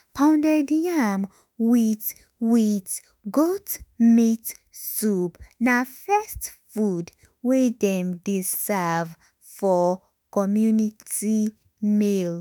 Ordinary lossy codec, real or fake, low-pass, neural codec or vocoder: none; fake; none; autoencoder, 48 kHz, 32 numbers a frame, DAC-VAE, trained on Japanese speech